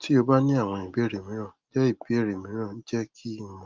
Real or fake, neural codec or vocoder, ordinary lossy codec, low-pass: real; none; Opus, 32 kbps; 7.2 kHz